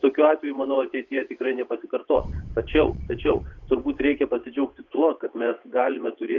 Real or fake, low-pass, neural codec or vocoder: fake; 7.2 kHz; vocoder, 22.05 kHz, 80 mel bands, WaveNeXt